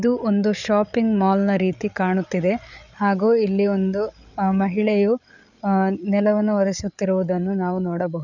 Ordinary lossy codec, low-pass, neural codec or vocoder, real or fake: none; 7.2 kHz; codec, 16 kHz, 8 kbps, FreqCodec, larger model; fake